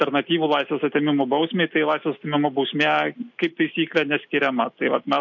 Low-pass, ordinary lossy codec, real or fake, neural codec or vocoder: 7.2 kHz; MP3, 48 kbps; real; none